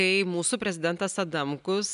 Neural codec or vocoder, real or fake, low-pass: none; real; 10.8 kHz